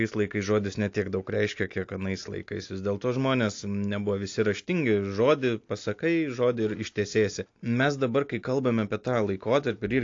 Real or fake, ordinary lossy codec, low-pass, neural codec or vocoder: real; AAC, 48 kbps; 7.2 kHz; none